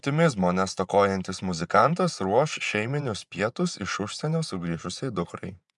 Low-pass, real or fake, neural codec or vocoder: 10.8 kHz; fake; vocoder, 24 kHz, 100 mel bands, Vocos